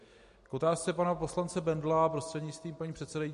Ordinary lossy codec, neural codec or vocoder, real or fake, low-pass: MP3, 48 kbps; none; real; 14.4 kHz